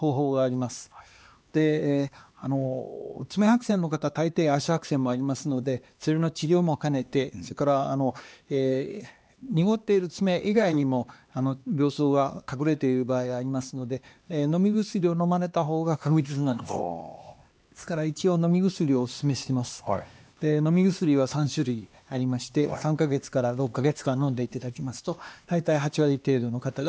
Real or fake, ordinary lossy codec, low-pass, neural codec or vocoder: fake; none; none; codec, 16 kHz, 2 kbps, X-Codec, HuBERT features, trained on LibriSpeech